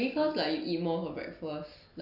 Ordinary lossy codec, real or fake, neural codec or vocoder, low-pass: none; real; none; 5.4 kHz